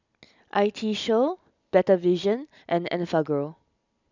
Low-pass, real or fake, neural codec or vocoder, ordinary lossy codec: 7.2 kHz; real; none; none